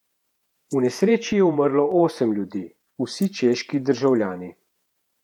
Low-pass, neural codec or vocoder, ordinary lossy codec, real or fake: 19.8 kHz; vocoder, 48 kHz, 128 mel bands, Vocos; none; fake